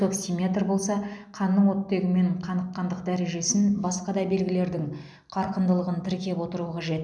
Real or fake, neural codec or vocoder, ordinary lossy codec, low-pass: real; none; none; none